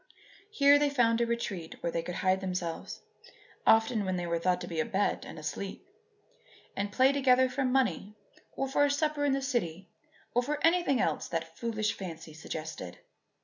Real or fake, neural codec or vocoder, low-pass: real; none; 7.2 kHz